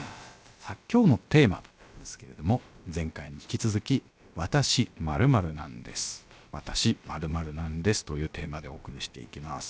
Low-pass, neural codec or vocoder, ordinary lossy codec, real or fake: none; codec, 16 kHz, about 1 kbps, DyCAST, with the encoder's durations; none; fake